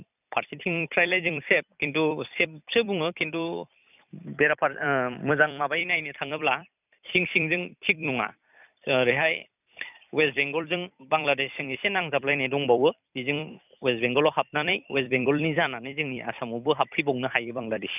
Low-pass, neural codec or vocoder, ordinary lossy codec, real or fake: 3.6 kHz; vocoder, 44.1 kHz, 128 mel bands every 256 samples, BigVGAN v2; none; fake